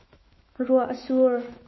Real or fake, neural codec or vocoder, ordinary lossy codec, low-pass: fake; autoencoder, 48 kHz, 128 numbers a frame, DAC-VAE, trained on Japanese speech; MP3, 24 kbps; 7.2 kHz